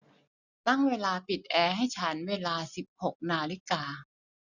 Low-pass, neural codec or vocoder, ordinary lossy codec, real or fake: 7.2 kHz; none; none; real